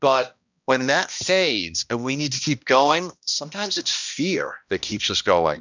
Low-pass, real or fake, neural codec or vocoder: 7.2 kHz; fake; codec, 16 kHz, 1 kbps, X-Codec, HuBERT features, trained on balanced general audio